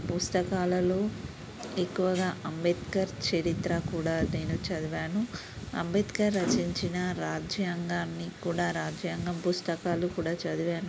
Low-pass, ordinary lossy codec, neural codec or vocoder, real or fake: none; none; none; real